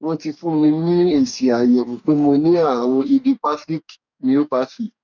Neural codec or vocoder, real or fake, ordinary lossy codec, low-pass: codec, 44.1 kHz, 2.6 kbps, DAC; fake; none; 7.2 kHz